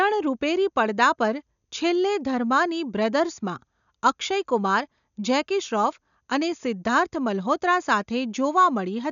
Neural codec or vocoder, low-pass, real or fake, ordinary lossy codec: none; 7.2 kHz; real; none